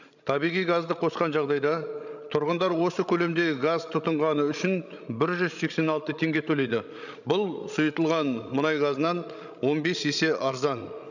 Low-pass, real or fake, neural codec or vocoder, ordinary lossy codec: 7.2 kHz; fake; codec, 16 kHz, 16 kbps, FreqCodec, larger model; none